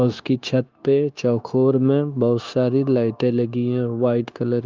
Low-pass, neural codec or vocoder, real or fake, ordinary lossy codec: 7.2 kHz; codec, 16 kHz, 0.9 kbps, LongCat-Audio-Codec; fake; Opus, 32 kbps